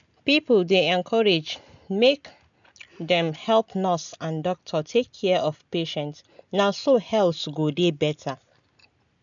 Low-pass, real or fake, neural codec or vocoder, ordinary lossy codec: 7.2 kHz; real; none; none